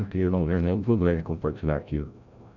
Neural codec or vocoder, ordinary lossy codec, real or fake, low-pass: codec, 16 kHz, 0.5 kbps, FreqCodec, larger model; none; fake; 7.2 kHz